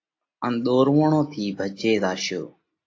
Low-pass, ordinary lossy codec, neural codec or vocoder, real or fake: 7.2 kHz; AAC, 32 kbps; none; real